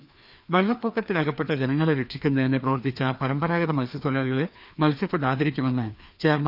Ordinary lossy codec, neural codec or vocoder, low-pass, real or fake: none; codec, 16 kHz, 2 kbps, FreqCodec, larger model; 5.4 kHz; fake